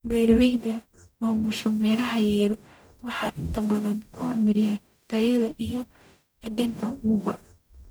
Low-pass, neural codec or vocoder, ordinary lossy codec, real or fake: none; codec, 44.1 kHz, 0.9 kbps, DAC; none; fake